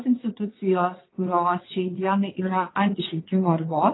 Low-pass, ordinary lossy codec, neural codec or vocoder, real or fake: 7.2 kHz; AAC, 16 kbps; vocoder, 22.05 kHz, 80 mel bands, WaveNeXt; fake